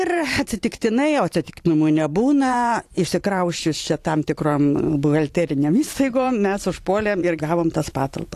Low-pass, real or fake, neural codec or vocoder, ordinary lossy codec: 14.4 kHz; fake; vocoder, 44.1 kHz, 128 mel bands every 512 samples, BigVGAN v2; AAC, 64 kbps